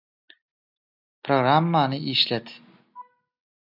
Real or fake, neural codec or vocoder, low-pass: real; none; 5.4 kHz